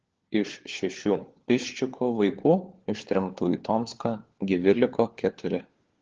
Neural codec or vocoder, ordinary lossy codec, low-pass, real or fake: codec, 16 kHz, 4 kbps, FunCodec, trained on LibriTTS, 50 frames a second; Opus, 16 kbps; 7.2 kHz; fake